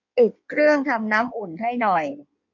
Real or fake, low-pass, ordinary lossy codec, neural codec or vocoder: fake; 7.2 kHz; MP3, 48 kbps; codec, 16 kHz in and 24 kHz out, 1.1 kbps, FireRedTTS-2 codec